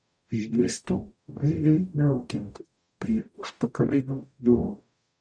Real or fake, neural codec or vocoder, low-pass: fake; codec, 44.1 kHz, 0.9 kbps, DAC; 9.9 kHz